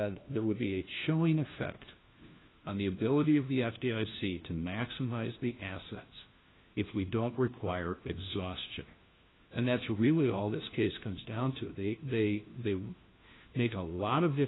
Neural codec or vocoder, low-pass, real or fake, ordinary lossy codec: codec, 16 kHz, 1 kbps, FunCodec, trained on LibriTTS, 50 frames a second; 7.2 kHz; fake; AAC, 16 kbps